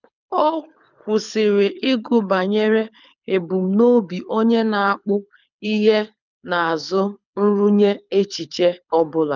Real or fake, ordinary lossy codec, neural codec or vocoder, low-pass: fake; none; codec, 24 kHz, 6 kbps, HILCodec; 7.2 kHz